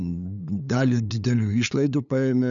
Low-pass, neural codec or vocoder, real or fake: 7.2 kHz; codec, 16 kHz, 8 kbps, FunCodec, trained on LibriTTS, 25 frames a second; fake